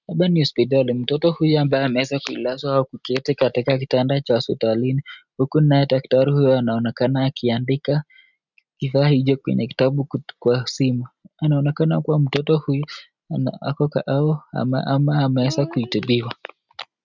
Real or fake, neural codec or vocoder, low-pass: real; none; 7.2 kHz